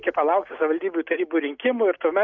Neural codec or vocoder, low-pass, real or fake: none; 7.2 kHz; real